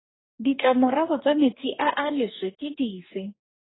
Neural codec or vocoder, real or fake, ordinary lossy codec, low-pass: codec, 44.1 kHz, 2.6 kbps, DAC; fake; AAC, 16 kbps; 7.2 kHz